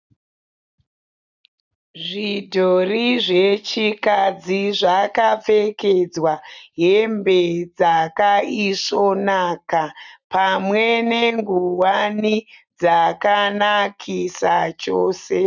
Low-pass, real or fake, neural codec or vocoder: 7.2 kHz; real; none